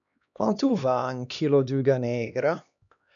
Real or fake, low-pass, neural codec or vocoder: fake; 7.2 kHz; codec, 16 kHz, 2 kbps, X-Codec, HuBERT features, trained on LibriSpeech